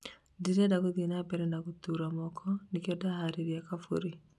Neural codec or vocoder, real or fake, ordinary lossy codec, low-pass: none; real; none; none